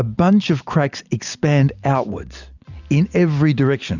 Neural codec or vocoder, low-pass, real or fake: none; 7.2 kHz; real